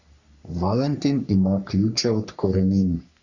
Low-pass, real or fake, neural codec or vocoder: 7.2 kHz; fake; codec, 44.1 kHz, 3.4 kbps, Pupu-Codec